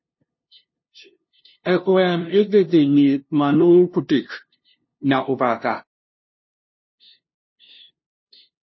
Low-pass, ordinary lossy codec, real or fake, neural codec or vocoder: 7.2 kHz; MP3, 24 kbps; fake; codec, 16 kHz, 0.5 kbps, FunCodec, trained on LibriTTS, 25 frames a second